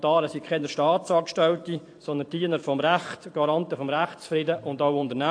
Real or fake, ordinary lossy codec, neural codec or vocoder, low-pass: fake; AAC, 48 kbps; vocoder, 44.1 kHz, 128 mel bands every 512 samples, BigVGAN v2; 9.9 kHz